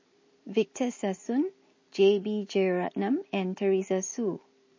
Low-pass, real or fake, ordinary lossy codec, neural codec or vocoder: 7.2 kHz; real; MP3, 32 kbps; none